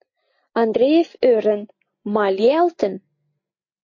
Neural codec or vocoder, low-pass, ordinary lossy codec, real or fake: none; 7.2 kHz; MP3, 32 kbps; real